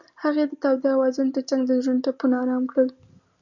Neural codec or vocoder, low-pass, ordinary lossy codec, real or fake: none; 7.2 kHz; Opus, 64 kbps; real